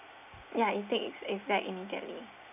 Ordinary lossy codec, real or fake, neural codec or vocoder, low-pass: none; real; none; 3.6 kHz